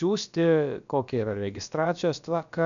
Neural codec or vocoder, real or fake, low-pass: codec, 16 kHz, about 1 kbps, DyCAST, with the encoder's durations; fake; 7.2 kHz